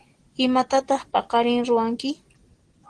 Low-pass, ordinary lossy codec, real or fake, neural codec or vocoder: 9.9 kHz; Opus, 16 kbps; real; none